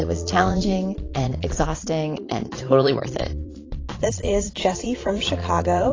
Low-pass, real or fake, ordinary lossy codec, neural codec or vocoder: 7.2 kHz; fake; AAC, 32 kbps; vocoder, 22.05 kHz, 80 mel bands, Vocos